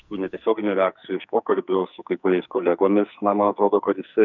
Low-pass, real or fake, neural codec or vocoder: 7.2 kHz; fake; codec, 44.1 kHz, 2.6 kbps, SNAC